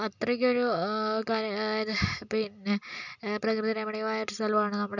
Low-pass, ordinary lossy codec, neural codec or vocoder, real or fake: 7.2 kHz; none; none; real